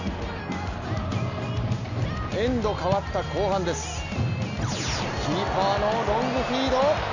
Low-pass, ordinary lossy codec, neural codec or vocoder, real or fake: 7.2 kHz; none; none; real